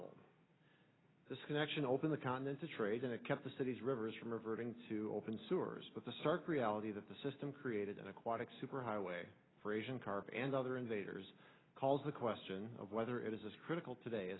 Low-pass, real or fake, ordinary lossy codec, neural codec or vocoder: 7.2 kHz; real; AAC, 16 kbps; none